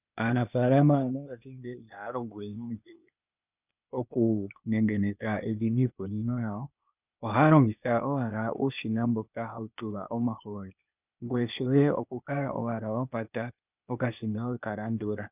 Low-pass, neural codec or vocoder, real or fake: 3.6 kHz; codec, 16 kHz, 0.8 kbps, ZipCodec; fake